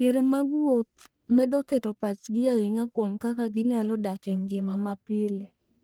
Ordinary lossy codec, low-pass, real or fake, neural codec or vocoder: none; none; fake; codec, 44.1 kHz, 1.7 kbps, Pupu-Codec